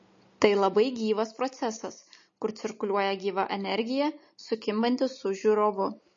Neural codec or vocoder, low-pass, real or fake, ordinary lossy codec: none; 7.2 kHz; real; MP3, 32 kbps